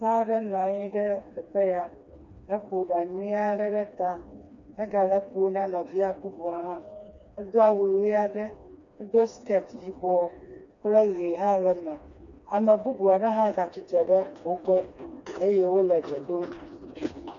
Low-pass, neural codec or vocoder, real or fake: 7.2 kHz; codec, 16 kHz, 2 kbps, FreqCodec, smaller model; fake